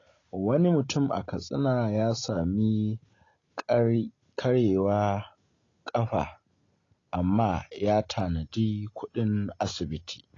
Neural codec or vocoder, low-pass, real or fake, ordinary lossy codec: none; 7.2 kHz; real; AAC, 32 kbps